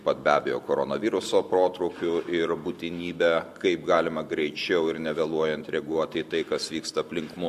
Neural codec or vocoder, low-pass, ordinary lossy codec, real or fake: none; 14.4 kHz; MP3, 64 kbps; real